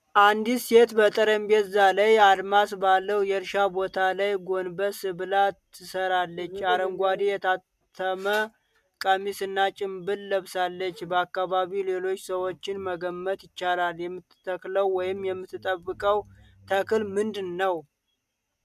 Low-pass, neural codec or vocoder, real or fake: 14.4 kHz; none; real